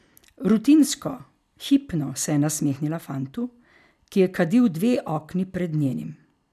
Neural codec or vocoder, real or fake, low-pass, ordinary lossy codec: none; real; 14.4 kHz; none